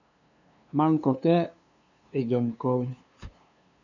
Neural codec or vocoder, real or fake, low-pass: codec, 16 kHz, 2 kbps, FunCodec, trained on LibriTTS, 25 frames a second; fake; 7.2 kHz